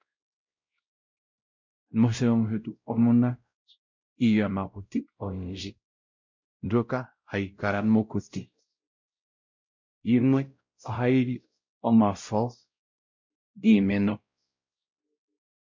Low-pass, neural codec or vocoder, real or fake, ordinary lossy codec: 7.2 kHz; codec, 16 kHz, 0.5 kbps, X-Codec, WavLM features, trained on Multilingual LibriSpeech; fake; MP3, 48 kbps